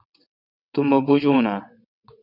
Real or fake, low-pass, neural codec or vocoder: fake; 5.4 kHz; vocoder, 22.05 kHz, 80 mel bands, WaveNeXt